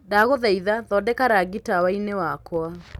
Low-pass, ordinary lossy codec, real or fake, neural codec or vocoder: 19.8 kHz; none; real; none